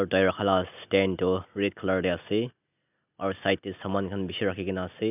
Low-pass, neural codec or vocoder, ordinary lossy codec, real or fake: 3.6 kHz; none; none; real